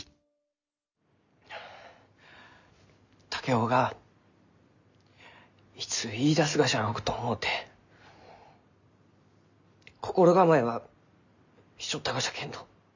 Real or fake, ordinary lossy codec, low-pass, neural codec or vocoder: real; none; 7.2 kHz; none